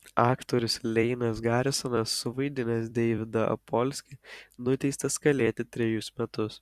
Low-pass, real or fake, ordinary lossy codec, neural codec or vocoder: 14.4 kHz; fake; Opus, 64 kbps; vocoder, 44.1 kHz, 128 mel bands every 256 samples, BigVGAN v2